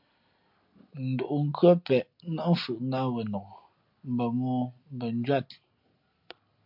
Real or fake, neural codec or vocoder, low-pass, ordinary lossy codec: real; none; 5.4 kHz; MP3, 48 kbps